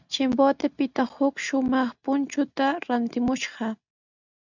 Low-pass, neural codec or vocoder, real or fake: 7.2 kHz; none; real